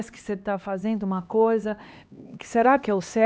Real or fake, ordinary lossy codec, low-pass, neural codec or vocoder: fake; none; none; codec, 16 kHz, 2 kbps, X-Codec, HuBERT features, trained on LibriSpeech